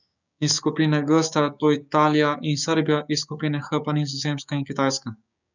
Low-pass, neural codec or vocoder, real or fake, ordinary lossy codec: 7.2 kHz; codec, 16 kHz, 6 kbps, DAC; fake; none